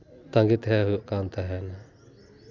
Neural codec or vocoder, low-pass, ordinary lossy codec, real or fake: none; 7.2 kHz; none; real